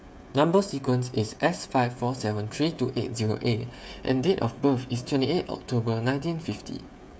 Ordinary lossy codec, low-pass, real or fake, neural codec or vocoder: none; none; fake; codec, 16 kHz, 16 kbps, FreqCodec, smaller model